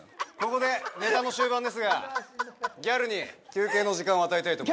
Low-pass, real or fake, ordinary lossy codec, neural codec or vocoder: none; real; none; none